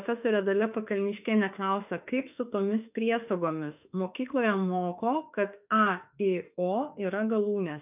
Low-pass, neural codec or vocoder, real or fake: 3.6 kHz; autoencoder, 48 kHz, 32 numbers a frame, DAC-VAE, trained on Japanese speech; fake